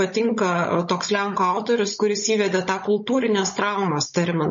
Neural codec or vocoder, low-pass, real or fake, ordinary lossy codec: codec, 16 kHz, 16 kbps, FunCodec, trained on Chinese and English, 50 frames a second; 7.2 kHz; fake; MP3, 32 kbps